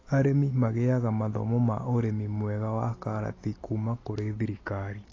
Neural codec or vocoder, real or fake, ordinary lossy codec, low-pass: none; real; AAC, 32 kbps; 7.2 kHz